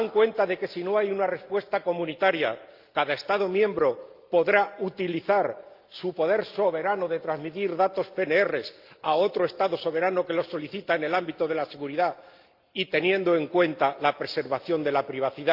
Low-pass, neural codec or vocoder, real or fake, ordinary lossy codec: 5.4 kHz; none; real; Opus, 32 kbps